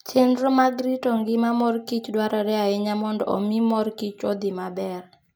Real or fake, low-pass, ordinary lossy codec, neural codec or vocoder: real; none; none; none